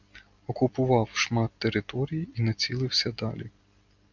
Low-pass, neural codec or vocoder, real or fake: 7.2 kHz; none; real